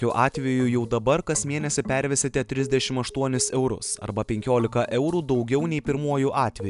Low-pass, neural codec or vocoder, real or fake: 10.8 kHz; none; real